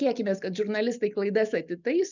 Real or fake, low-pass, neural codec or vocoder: real; 7.2 kHz; none